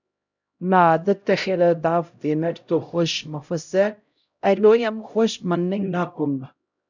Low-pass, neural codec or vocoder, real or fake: 7.2 kHz; codec, 16 kHz, 0.5 kbps, X-Codec, HuBERT features, trained on LibriSpeech; fake